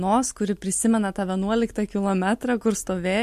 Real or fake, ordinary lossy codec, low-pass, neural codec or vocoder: real; MP3, 64 kbps; 14.4 kHz; none